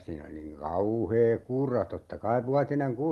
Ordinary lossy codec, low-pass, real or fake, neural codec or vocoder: Opus, 24 kbps; 19.8 kHz; real; none